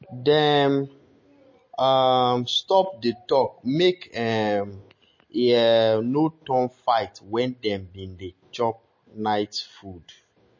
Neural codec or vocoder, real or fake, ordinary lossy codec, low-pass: none; real; MP3, 32 kbps; 7.2 kHz